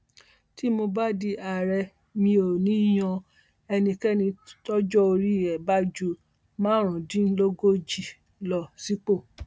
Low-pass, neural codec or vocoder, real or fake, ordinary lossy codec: none; none; real; none